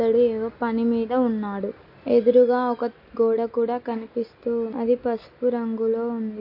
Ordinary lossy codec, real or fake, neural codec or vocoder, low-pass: MP3, 32 kbps; real; none; 5.4 kHz